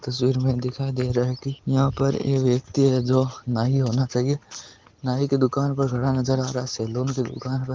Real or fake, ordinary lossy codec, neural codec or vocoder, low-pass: real; Opus, 16 kbps; none; 7.2 kHz